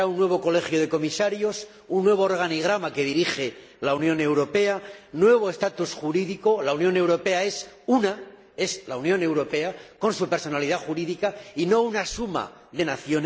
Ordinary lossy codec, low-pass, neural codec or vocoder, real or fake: none; none; none; real